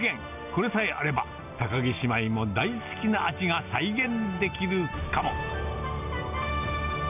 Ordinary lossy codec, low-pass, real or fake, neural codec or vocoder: none; 3.6 kHz; real; none